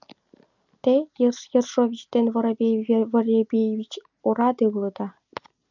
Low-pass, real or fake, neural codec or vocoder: 7.2 kHz; real; none